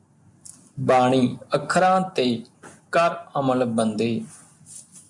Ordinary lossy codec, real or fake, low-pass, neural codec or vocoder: MP3, 96 kbps; real; 10.8 kHz; none